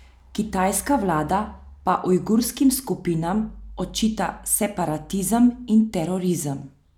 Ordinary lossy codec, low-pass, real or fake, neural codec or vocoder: none; 19.8 kHz; real; none